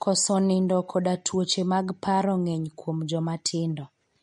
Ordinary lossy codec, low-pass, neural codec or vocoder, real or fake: MP3, 48 kbps; 19.8 kHz; none; real